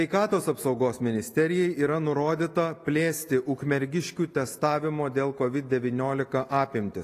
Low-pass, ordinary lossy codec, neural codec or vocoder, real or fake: 14.4 kHz; AAC, 48 kbps; none; real